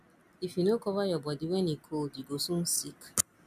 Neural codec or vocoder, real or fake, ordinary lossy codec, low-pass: none; real; MP3, 96 kbps; 14.4 kHz